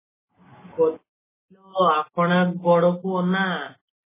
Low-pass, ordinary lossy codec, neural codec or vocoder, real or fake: 3.6 kHz; MP3, 16 kbps; none; real